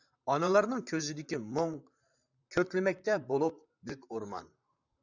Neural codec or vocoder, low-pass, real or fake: vocoder, 44.1 kHz, 128 mel bands, Pupu-Vocoder; 7.2 kHz; fake